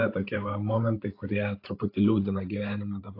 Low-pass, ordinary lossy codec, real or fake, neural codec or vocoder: 5.4 kHz; AAC, 32 kbps; fake; codec, 16 kHz, 8 kbps, FreqCodec, larger model